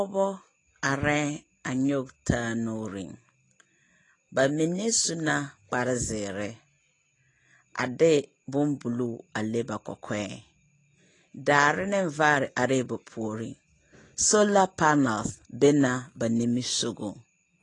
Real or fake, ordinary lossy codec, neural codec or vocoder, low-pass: real; AAC, 32 kbps; none; 10.8 kHz